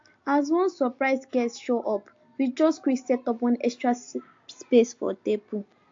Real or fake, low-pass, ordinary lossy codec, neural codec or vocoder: real; 7.2 kHz; AAC, 48 kbps; none